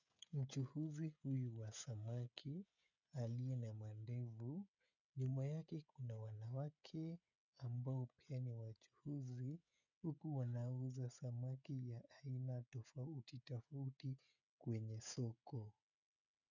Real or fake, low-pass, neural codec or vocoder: fake; 7.2 kHz; codec, 16 kHz, 16 kbps, FreqCodec, smaller model